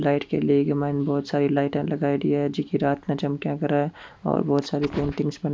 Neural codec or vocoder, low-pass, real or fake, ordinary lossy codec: none; none; real; none